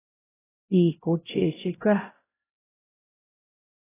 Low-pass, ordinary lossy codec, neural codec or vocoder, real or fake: 3.6 kHz; AAC, 16 kbps; codec, 16 kHz, 0.5 kbps, X-Codec, HuBERT features, trained on LibriSpeech; fake